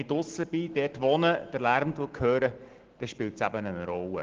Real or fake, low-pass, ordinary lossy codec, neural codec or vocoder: real; 7.2 kHz; Opus, 16 kbps; none